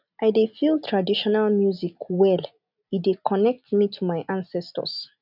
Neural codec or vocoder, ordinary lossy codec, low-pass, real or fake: none; none; 5.4 kHz; real